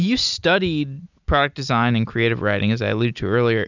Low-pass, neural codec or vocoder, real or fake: 7.2 kHz; none; real